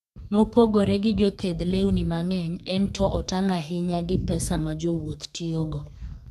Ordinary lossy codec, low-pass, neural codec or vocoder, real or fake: none; 14.4 kHz; codec, 32 kHz, 1.9 kbps, SNAC; fake